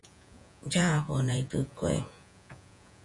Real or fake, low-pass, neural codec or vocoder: fake; 10.8 kHz; vocoder, 48 kHz, 128 mel bands, Vocos